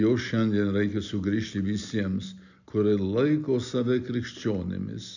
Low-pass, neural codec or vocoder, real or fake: 7.2 kHz; none; real